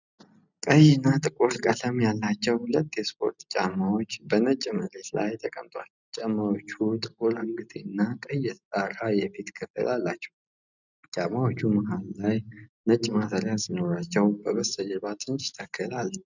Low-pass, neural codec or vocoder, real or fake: 7.2 kHz; none; real